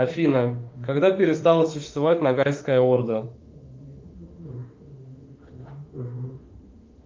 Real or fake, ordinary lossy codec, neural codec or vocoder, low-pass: fake; Opus, 32 kbps; autoencoder, 48 kHz, 32 numbers a frame, DAC-VAE, trained on Japanese speech; 7.2 kHz